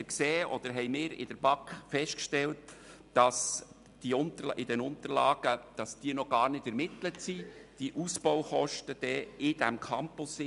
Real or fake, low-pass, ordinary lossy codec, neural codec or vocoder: real; 10.8 kHz; none; none